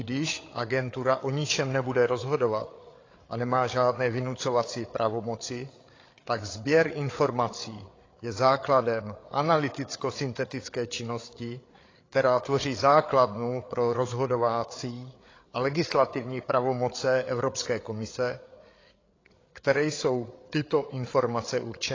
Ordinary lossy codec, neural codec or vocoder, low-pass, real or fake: AAC, 32 kbps; codec, 16 kHz, 8 kbps, FreqCodec, larger model; 7.2 kHz; fake